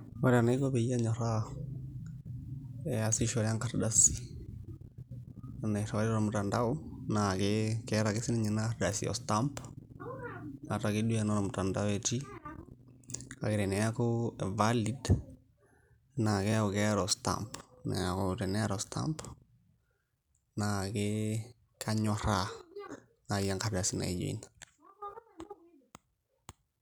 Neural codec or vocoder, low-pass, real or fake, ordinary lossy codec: none; 19.8 kHz; real; none